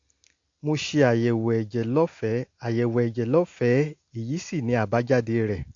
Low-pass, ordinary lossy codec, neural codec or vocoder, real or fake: 7.2 kHz; AAC, 48 kbps; none; real